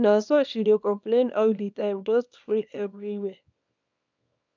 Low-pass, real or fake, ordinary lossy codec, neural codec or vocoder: 7.2 kHz; fake; none; codec, 24 kHz, 0.9 kbps, WavTokenizer, small release